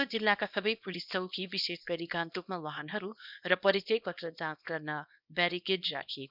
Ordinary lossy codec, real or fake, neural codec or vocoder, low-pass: none; fake; codec, 24 kHz, 0.9 kbps, WavTokenizer, small release; 5.4 kHz